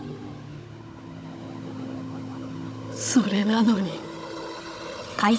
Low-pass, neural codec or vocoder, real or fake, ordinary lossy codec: none; codec, 16 kHz, 16 kbps, FunCodec, trained on Chinese and English, 50 frames a second; fake; none